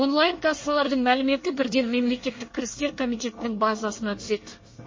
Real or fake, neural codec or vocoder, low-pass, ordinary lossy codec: fake; codec, 24 kHz, 1 kbps, SNAC; 7.2 kHz; MP3, 32 kbps